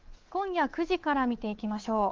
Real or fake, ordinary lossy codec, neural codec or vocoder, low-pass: fake; Opus, 16 kbps; codec, 24 kHz, 3.1 kbps, DualCodec; 7.2 kHz